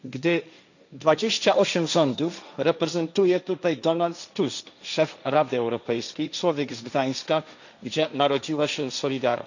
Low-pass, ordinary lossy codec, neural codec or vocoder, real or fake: 7.2 kHz; none; codec, 16 kHz, 1.1 kbps, Voila-Tokenizer; fake